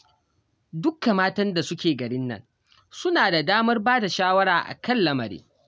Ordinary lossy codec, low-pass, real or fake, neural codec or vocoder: none; none; real; none